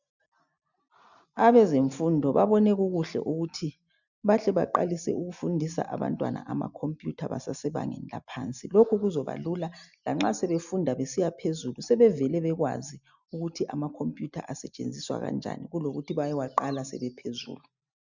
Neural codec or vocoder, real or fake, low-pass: none; real; 7.2 kHz